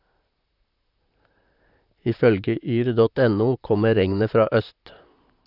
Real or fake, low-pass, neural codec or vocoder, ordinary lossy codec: fake; 5.4 kHz; vocoder, 44.1 kHz, 128 mel bands, Pupu-Vocoder; none